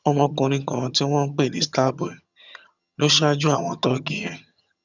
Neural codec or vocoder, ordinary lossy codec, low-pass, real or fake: vocoder, 22.05 kHz, 80 mel bands, HiFi-GAN; none; 7.2 kHz; fake